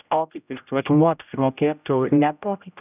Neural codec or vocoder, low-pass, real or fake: codec, 16 kHz, 0.5 kbps, X-Codec, HuBERT features, trained on general audio; 3.6 kHz; fake